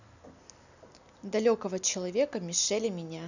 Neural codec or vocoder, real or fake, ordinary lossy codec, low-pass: none; real; none; 7.2 kHz